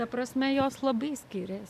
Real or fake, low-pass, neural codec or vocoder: real; 14.4 kHz; none